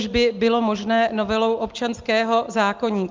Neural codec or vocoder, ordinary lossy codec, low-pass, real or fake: none; Opus, 32 kbps; 7.2 kHz; real